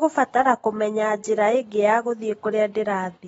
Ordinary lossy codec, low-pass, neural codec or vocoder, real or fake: AAC, 24 kbps; 19.8 kHz; none; real